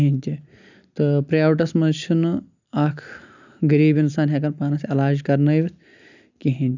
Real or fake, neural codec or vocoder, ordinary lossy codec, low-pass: real; none; none; 7.2 kHz